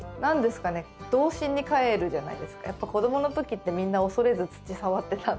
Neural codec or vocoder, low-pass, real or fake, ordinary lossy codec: none; none; real; none